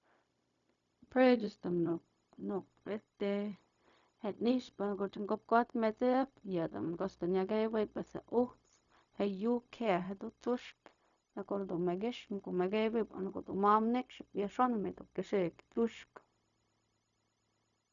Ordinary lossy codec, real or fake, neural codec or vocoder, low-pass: none; fake; codec, 16 kHz, 0.4 kbps, LongCat-Audio-Codec; 7.2 kHz